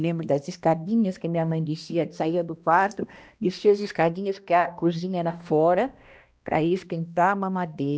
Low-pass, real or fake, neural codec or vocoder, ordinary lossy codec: none; fake; codec, 16 kHz, 1 kbps, X-Codec, HuBERT features, trained on balanced general audio; none